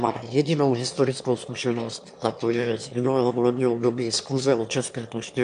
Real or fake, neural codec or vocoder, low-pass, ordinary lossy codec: fake; autoencoder, 22.05 kHz, a latent of 192 numbers a frame, VITS, trained on one speaker; 9.9 kHz; AAC, 64 kbps